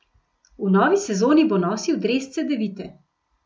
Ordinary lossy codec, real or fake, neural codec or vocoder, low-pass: none; real; none; none